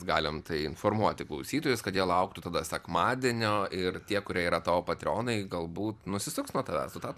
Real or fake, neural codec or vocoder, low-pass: fake; vocoder, 44.1 kHz, 128 mel bands every 256 samples, BigVGAN v2; 14.4 kHz